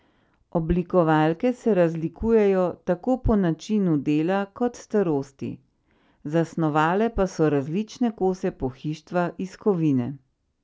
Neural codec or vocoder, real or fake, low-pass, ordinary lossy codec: none; real; none; none